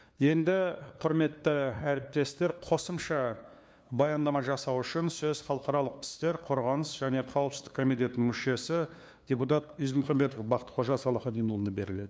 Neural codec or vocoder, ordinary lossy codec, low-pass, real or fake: codec, 16 kHz, 2 kbps, FunCodec, trained on LibriTTS, 25 frames a second; none; none; fake